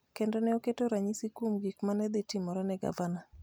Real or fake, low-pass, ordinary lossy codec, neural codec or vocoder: real; none; none; none